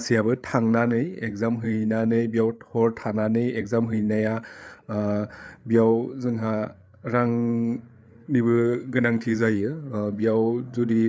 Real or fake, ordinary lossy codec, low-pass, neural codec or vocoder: fake; none; none; codec, 16 kHz, 8 kbps, FreqCodec, larger model